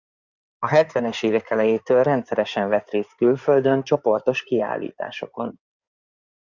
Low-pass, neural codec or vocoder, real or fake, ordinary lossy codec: 7.2 kHz; codec, 16 kHz in and 24 kHz out, 2.2 kbps, FireRedTTS-2 codec; fake; Opus, 64 kbps